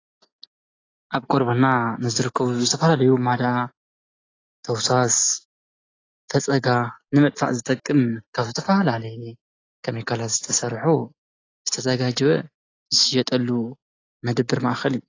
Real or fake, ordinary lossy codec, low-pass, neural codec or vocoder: real; AAC, 32 kbps; 7.2 kHz; none